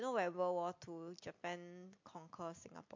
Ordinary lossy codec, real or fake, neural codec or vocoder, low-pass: MP3, 48 kbps; real; none; 7.2 kHz